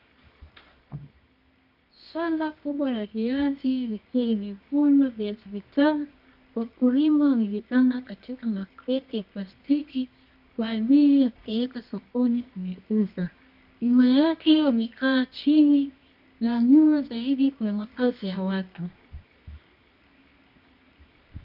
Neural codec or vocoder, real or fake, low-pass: codec, 24 kHz, 0.9 kbps, WavTokenizer, medium music audio release; fake; 5.4 kHz